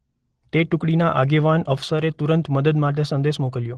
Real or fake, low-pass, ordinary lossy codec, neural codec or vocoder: real; 9.9 kHz; Opus, 16 kbps; none